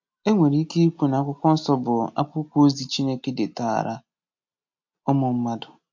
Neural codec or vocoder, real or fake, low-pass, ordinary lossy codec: none; real; 7.2 kHz; MP3, 48 kbps